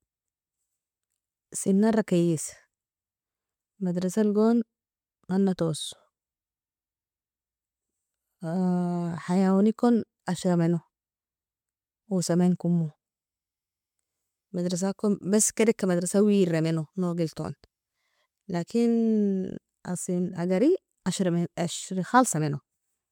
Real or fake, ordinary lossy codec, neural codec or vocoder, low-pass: real; MP3, 96 kbps; none; 19.8 kHz